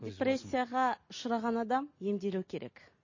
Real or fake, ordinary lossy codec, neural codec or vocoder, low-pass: real; MP3, 32 kbps; none; 7.2 kHz